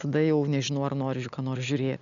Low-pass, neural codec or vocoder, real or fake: 7.2 kHz; none; real